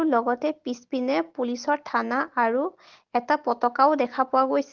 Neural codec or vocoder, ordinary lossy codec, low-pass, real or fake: none; Opus, 16 kbps; 7.2 kHz; real